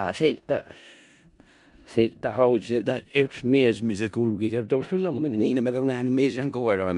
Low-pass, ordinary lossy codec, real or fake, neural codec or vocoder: 10.8 kHz; MP3, 96 kbps; fake; codec, 16 kHz in and 24 kHz out, 0.4 kbps, LongCat-Audio-Codec, four codebook decoder